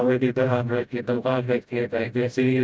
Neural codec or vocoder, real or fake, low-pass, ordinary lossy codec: codec, 16 kHz, 0.5 kbps, FreqCodec, smaller model; fake; none; none